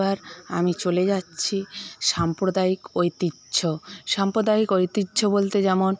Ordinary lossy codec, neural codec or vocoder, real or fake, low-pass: none; none; real; none